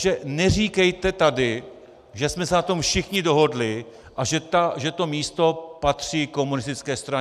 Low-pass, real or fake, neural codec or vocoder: 14.4 kHz; real; none